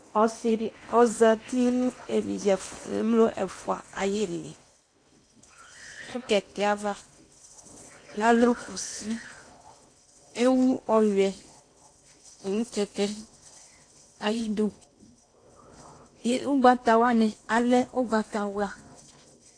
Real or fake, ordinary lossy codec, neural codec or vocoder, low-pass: fake; AAC, 48 kbps; codec, 16 kHz in and 24 kHz out, 0.8 kbps, FocalCodec, streaming, 65536 codes; 9.9 kHz